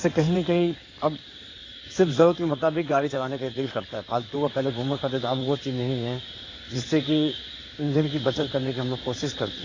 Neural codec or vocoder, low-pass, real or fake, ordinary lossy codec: codec, 16 kHz in and 24 kHz out, 2.2 kbps, FireRedTTS-2 codec; 7.2 kHz; fake; AAC, 32 kbps